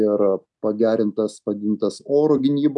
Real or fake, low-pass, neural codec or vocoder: real; 10.8 kHz; none